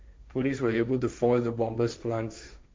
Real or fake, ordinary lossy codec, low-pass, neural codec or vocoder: fake; none; none; codec, 16 kHz, 1.1 kbps, Voila-Tokenizer